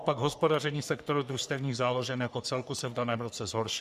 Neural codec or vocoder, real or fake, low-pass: codec, 44.1 kHz, 3.4 kbps, Pupu-Codec; fake; 14.4 kHz